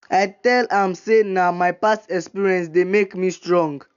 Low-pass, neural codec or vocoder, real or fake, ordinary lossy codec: 7.2 kHz; none; real; none